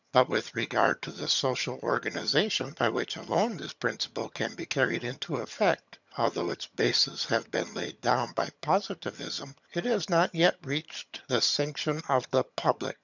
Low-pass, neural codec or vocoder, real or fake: 7.2 kHz; vocoder, 22.05 kHz, 80 mel bands, HiFi-GAN; fake